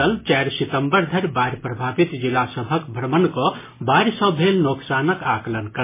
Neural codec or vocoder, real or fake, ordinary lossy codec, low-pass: none; real; MP3, 16 kbps; 3.6 kHz